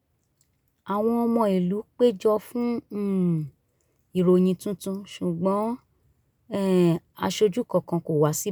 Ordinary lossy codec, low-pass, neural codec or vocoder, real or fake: none; none; none; real